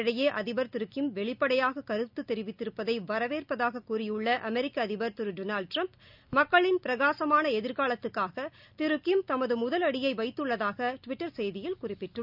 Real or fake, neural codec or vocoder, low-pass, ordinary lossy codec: real; none; 5.4 kHz; none